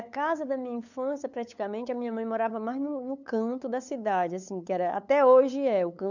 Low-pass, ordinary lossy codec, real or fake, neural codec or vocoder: 7.2 kHz; none; fake; codec, 16 kHz, 8 kbps, FunCodec, trained on LibriTTS, 25 frames a second